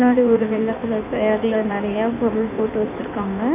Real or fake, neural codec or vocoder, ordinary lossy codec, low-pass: fake; codec, 16 kHz in and 24 kHz out, 1.1 kbps, FireRedTTS-2 codec; none; 3.6 kHz